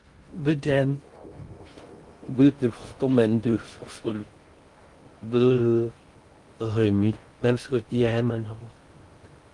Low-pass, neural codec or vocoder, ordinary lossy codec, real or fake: 10.8 kHz; codec, 16 kHz in and 24 kHz out, 0.6 kbps, FocalCodec, streaming, 2048 codes; Opus, 32 kbps; fake